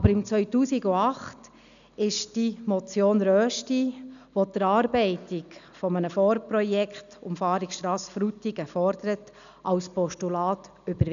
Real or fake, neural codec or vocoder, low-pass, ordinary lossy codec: real; none; 7.2 kHz; none